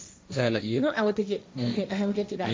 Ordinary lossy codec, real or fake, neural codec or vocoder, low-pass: none; fake; codec, 16 kHz, 1.1 kbps, Voila-Tokenizer; none